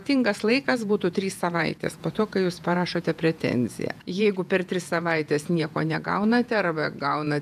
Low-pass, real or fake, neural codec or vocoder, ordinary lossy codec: 14.4 kHz; fake; vocoder, 48 kHz, 128 mel bands, Vocos; AAC, 96 kbps